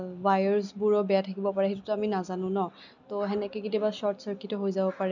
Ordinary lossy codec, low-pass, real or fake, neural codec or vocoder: none; 7.2 kHz; real; none